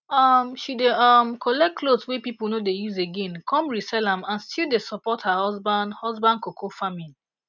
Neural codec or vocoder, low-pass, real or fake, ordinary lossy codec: none; 7.2 kHz; real; none